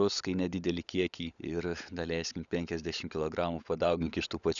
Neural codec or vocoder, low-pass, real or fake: codec, 16 kHz, 16 kbps, FunCodec, trained on LibriTTS, 50 frames a second; 7.2 kHz; fake